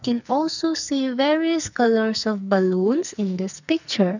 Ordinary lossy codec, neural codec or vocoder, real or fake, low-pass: none; codec, 44.1 kHz, 2.6 kbps, SNAC; fake; 7.2 kHz